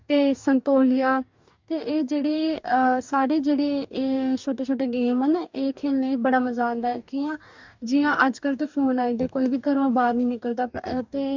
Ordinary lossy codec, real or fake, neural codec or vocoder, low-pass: MP3, 64 kbps; fake; codec, 44.1 kHz, 2.6 kbps, DAC; 7.2 kHz